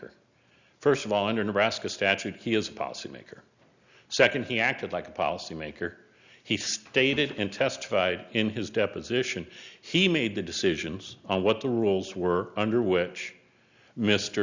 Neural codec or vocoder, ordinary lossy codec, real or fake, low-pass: none; Opus, 64 kbps; real; 7.2 kHz